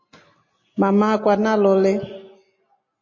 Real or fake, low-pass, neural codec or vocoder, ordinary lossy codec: real; 7.2 kHz; none; MP3, 32 kbps